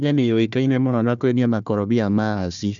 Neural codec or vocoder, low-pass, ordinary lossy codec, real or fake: codec, 16 kHz, 1 kbps, FunCodec, trained on Chinese and English, 50 frames a second; 7.2 kHz; none; fake